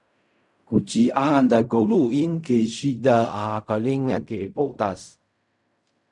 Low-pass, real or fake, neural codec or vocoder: 10.8 kHz; fake; codec, 16 kHz in and 24 kHz out, 0.4 kbps, LongCat-Audio-Codec, fine tuned four codebook decoder